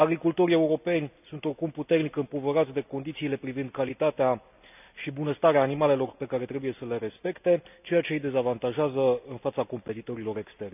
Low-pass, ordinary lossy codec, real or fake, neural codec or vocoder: 3.6 kHz; none; real; none